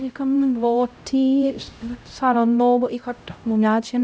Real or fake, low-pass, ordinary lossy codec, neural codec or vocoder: fake; none; none; codec, 16 kHz, 0.5 kbps, X-Codec, HuBERT features, trained on LibriSpeech